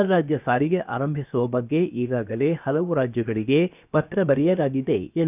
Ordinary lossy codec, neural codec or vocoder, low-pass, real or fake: none; codec, 16 kHz, 0.7 kbps, FocalCodec; 3.6 kHz; fake